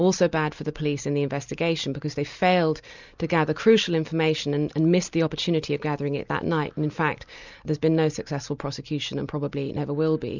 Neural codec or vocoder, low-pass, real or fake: none; 7.2 kHz; real